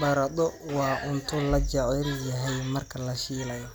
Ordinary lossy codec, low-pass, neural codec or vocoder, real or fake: none; none; none; real